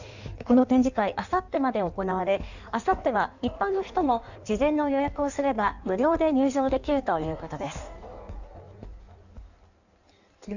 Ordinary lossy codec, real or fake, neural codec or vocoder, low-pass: none; fake; codec, 16 kHz in and 24 kHz out, 1.1 kbps, FireRedTTS-2 codec; 7.2 kHz